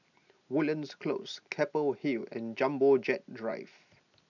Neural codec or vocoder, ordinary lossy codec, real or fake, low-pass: none; none; real; 7.2 kHz